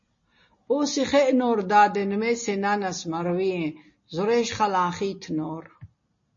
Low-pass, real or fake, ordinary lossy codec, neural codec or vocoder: 7.2 kHz; real; MP3, 32 kbps; none